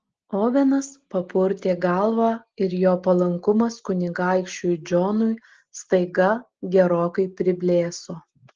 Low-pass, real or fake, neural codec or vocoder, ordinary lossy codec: 7.2 kHz; real; none; Opus, 16 kbps